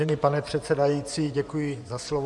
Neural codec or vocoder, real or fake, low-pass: vocoder, 24 kHz, 100 mel bands, Vocos; fake; 10.8 kHz